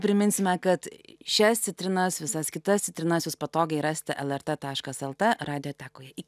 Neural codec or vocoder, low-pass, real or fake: none; 14.4 kHz; real